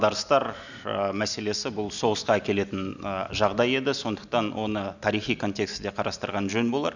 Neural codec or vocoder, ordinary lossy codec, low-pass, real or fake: none; none; 7.2 kHz; real